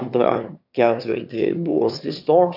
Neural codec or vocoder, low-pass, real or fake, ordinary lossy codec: autoencoder, 22.05 kHz, a latent of 192 numbers a frame, VITS, trained on one speaker; 5.4 kHz; fake; AAC, 48 kbps